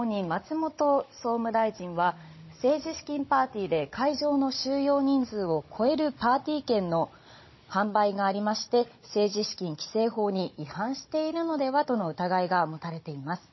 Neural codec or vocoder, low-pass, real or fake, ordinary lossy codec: codec, 16 kHz, 16 kbps, FunCodec, trained on Chinese and English, 50 frames a second; 7.2 kHz; fake; MP3, 24 kbps